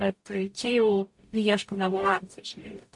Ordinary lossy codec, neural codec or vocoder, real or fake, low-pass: MP3, 48 kbps; codec, 44.1 kHz, 0.9 kbps, DAC; fake; 10.8 kHz